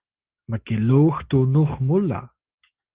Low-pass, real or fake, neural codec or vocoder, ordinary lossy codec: 3.6 kHz; real; none; Opus, 16 kbps